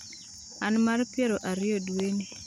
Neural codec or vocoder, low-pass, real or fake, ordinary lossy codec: none; 19.8 kHz; real; none